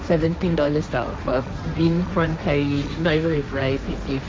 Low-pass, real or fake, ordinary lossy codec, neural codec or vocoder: none; fake; none; codec, 16 kHz, 1.1 kbps, Voila-Tokenizer